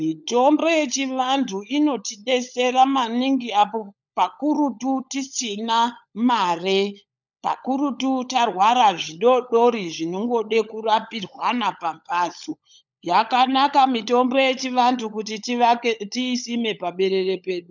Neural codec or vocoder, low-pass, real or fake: codec, 16 kHz, 16 kbps, FunCodec, trained on LibriTTS, 50 frames a second; 7.2 kHz; fake